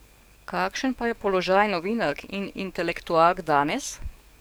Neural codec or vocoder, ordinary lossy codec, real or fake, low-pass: codec, 44.1 kHz, 7.8 kbps, DAC; none; fake; none